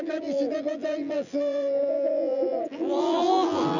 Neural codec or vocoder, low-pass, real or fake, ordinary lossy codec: vocoder, 24 kHz, 100 mel bands, Vocos; 7.2 kHz; fake; none